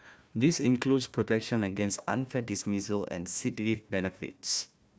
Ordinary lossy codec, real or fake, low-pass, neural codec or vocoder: none; fake; none; codec, 16 kHz, 1 kbps, FunCodec, trained on Chinese and English, 50 frames a second